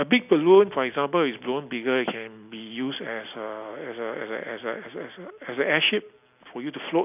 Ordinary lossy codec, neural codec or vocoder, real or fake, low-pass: none; none; real; 3.6 kHz